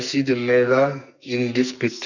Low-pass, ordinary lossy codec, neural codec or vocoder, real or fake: 7.2 kHz; none; codec, 44.1 kHz, 2.6 kbps, SNAC; fake